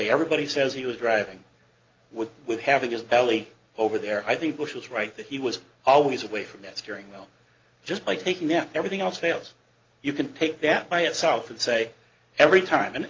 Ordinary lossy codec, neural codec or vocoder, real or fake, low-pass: Opus, 32 kbps; none; real; 7.2 kHz